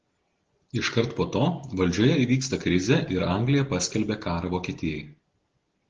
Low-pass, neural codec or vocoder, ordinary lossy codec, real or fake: 7.2 kHz; none; Opus, 16 kbps; real